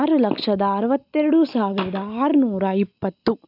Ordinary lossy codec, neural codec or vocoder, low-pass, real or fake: none; none; 5.4 kHz; real